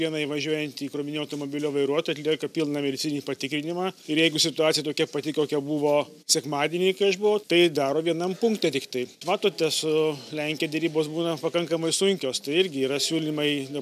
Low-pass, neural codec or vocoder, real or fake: 14.4 kHz; none; real